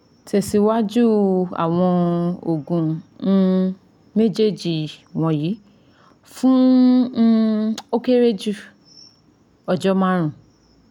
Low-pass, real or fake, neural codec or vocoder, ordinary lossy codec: 19.8 kHz; real; none; none